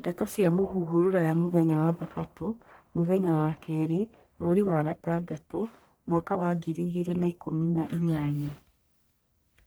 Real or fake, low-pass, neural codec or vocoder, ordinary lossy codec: fake; none; codec, 44.1 kHz, 1.7 kbps, Pupu-Codec; none